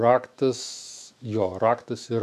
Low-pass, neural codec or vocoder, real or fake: 14.4 kHz; autoencoder, 48 kHz, 128 numbers a frame, DAC-VAE, trained on Japanese speech; fake